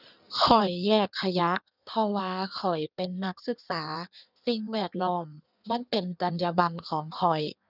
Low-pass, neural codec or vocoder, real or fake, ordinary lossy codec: 5.4 kHz; codec, 16 kHz in and 24 kHz out, 1.1 kbps, FireRedTTS-2 codec; fake; none